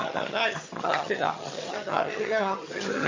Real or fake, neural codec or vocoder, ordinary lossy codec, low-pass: fake; vocoder, 22.05 kHz, 80 mel bands, HiFi-GAN; MP3, 32 kbps; 7.2 kHz